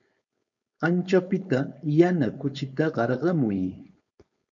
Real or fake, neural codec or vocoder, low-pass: fake; codec, 16 kHz, 4.8 kbps, FACodec; 7.2 kHz